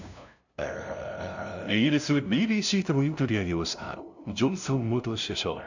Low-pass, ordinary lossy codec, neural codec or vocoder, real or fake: 7.2 kHz; none; codec, 16 kHz, 0.5 kbps, FunCodec, trained on LibriTTS, 25 frames a second; fake